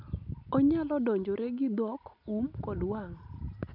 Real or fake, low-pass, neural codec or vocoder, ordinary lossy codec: real; 5.4 kHz; none; none